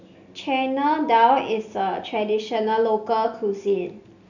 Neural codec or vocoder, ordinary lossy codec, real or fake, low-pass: none; none; real; 7.2 kHz